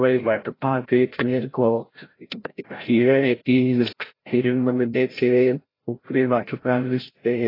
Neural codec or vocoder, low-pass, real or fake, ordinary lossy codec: codec, 16 kHz, 0.5 kbps, FreqCodec, larger model; 5.4 kHz; fake; AAC, 24 kbps